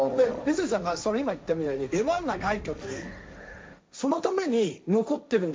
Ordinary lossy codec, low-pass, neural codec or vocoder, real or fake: none; none; codec, 16 kHz, 1.1 kbps, Voila-Tokenizer; fake